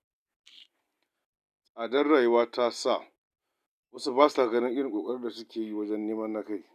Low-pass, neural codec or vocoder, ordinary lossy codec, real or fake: 14.4 kHz; none; none; real